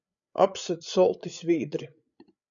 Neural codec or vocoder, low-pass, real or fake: codec, 16 kHz, 8 kbps, FreqCodec, larger model; 7.2 kHz; fake